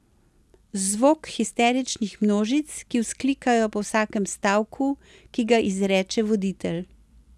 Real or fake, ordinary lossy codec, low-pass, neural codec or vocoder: real; none; none; none